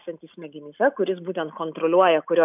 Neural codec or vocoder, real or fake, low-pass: none; real; 3.6 kHz